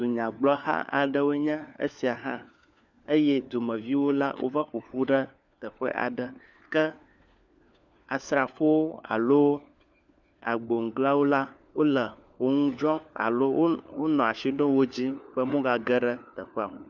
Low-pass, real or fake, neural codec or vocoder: 7.2 kHz; fake; codec, 16 kHz, 4 kbps, FunCodec, trained on LibriTTS, 50 frames a second